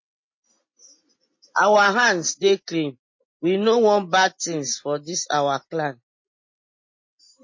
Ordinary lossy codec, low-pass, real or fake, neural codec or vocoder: MP3, 32 kbps; 7.2 kHz; real; none